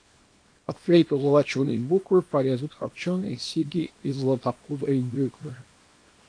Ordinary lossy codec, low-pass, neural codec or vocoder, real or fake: AAC, 48 kbps; 9.9 kHz; codec, 24 kHz, 0.9 kbps, WavTokenizer, small release; fake